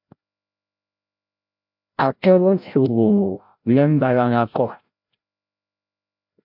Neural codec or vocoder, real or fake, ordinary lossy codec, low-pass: codec, 16 kHz, 0.5 kbps, FreqCodec, larger model; fake; MP3, 48 kbps; 5.4 kHz